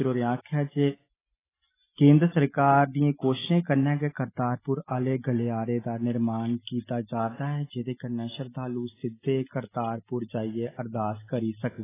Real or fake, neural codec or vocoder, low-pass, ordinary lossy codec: real; none; 3.6 kHz; AAC, 16 kbps